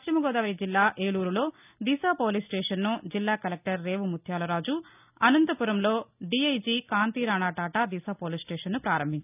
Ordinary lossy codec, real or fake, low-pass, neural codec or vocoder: none; real; 3.6 kHz; none